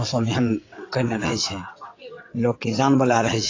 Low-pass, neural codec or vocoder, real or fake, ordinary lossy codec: 7.2 kHz; vocoder, 22.05 kHz, 80 mel bands, WaveNeXt; fake; AAC, 32 kbps